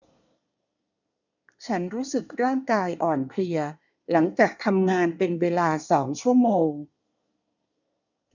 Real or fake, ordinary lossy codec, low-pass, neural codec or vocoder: fake; none; 7.2 kHz; codec, 24 kHz, 1 kbps, SNAC